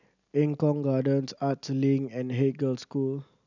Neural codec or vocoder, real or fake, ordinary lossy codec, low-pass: none; real; none; 7.2 kHz